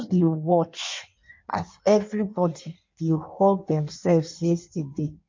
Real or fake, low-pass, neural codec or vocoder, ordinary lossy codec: fake; 7.2 kHz; codec, 16 kHz in and 24 kHz out, 1.1 kbps, FireRedTTS-2 codec; MP3, 48 kbps